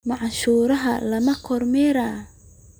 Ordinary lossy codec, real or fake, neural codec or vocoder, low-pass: none; real; none; none